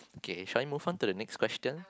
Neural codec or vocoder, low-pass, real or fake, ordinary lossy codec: none; none; real; none